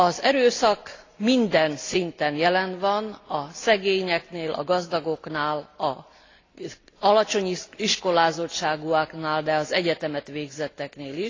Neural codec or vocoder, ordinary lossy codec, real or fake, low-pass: none; AAC, 32 kbps; real; 7.2 kHz